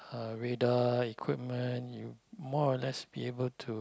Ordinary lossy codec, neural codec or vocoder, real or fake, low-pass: none; none; real; none